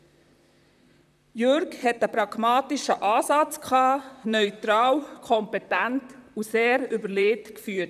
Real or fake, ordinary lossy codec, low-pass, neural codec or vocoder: fake; none; 14.4 kHz; vocoder, 44.1 kHz, 128 mel bands, Pupu-Vocoder